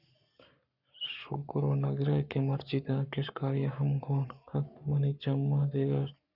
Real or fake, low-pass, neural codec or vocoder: fake; 5.4 kHz; codec, 44.1 kHz, 7.8 kbps, Pupu-Codec